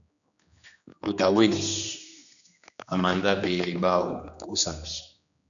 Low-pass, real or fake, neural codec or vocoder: 7.2 kHz; fake; codec, 16 kHz, 2 kbps, X-Codec, HuBERT features, trained on general audio